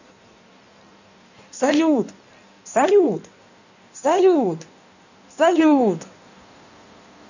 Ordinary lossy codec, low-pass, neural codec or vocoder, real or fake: none; 7.2 kHz; codec, 16 kHz in and 24 kHz out, 1.1 kbps, FireRedTTS-2 codec; fake